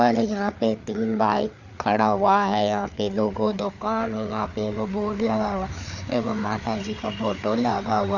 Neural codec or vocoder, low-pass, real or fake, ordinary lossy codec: codec, 16 kHz, 4 kbps, FreqCodec, larger model; 7.2 kHz; fake; none